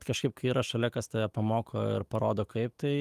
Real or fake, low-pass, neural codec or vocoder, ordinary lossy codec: fake; 14.4 kHz; autoencoder, 48 kHz, 128 numbers a frame, DAC-VAE, trained on Japanese speech; Opus, 24 kbps